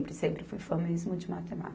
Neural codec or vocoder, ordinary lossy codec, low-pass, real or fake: none; none; none; real